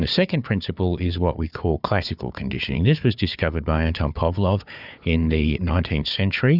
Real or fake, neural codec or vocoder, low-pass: fake; codec, 16 kHz, 4 kbps, FunCodec, trained on Chinese and English, 50 frames a second; 5.4 kHz